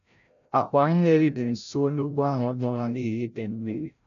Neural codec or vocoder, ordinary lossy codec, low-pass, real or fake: codec, 16 kHz, 0.5 kbps, FreqCodec, larger model; none; 7.2 kHz; fake